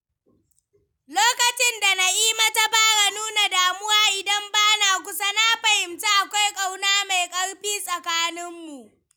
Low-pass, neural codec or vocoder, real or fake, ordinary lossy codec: none; none; real; none